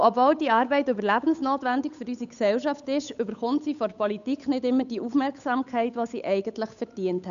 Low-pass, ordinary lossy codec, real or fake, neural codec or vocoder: 7.2 kHz; none; fake; codec, 16 kHz, 8 kbps, FunCodec, trained on Chinese and English, 25 frames a second